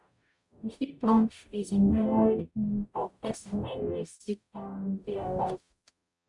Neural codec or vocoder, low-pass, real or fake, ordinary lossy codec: codec, 44.1 kHz, 0.9 kbps, DAC; 10.8 kHz; fake; none